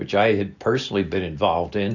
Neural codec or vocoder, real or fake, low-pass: none; real; 7.2 kHz